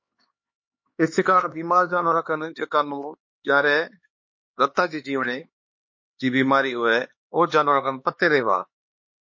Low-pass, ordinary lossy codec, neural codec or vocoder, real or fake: 7.2 kHz; MP3, 32 kbps; codec, 16 kHz, 4 kbps, X-Codec, HuBERT features, trained on LibriSpeech; fake